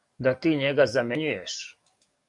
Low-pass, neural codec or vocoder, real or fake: 10.8 kHz; codec, 44.1 kHz, 7.8 kbps, DAC; fake